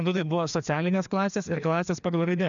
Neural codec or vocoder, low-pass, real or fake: codec, 16 kHz, 2 kbps, FreqCodec, larger model; 7.2 kHz; fake